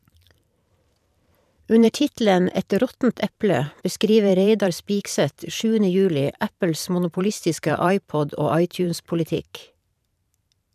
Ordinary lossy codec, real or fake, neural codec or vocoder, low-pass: none; fake; vocoder, 44.1 kHz, 128 mel bands every 512 samples, BigVGAN v2; 14.4 kHz